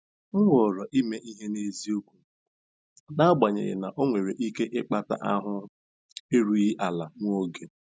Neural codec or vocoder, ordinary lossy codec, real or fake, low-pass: none; none; real; none